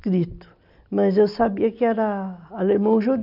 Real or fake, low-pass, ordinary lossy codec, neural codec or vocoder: real; 5.4 kHz; none; none